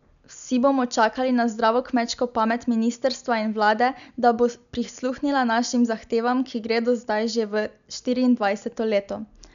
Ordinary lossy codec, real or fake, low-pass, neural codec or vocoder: none; real; 7.2 kHz; none